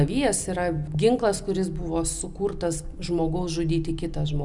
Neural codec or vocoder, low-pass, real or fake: none; 10.8 kHz; real